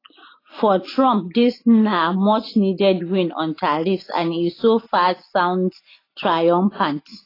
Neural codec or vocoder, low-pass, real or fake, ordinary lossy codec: none; 5.4 kHz; real; AAC, 24 kbps